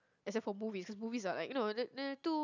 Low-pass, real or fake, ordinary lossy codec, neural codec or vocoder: 7.2 kHz; real; none; none